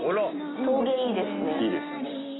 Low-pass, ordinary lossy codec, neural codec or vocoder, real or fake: 7.2 kHz; AAC, 16 kbps; none; real